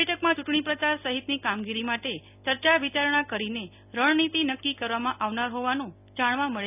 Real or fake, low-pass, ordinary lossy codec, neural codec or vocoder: real; 3.6 kHz; none; none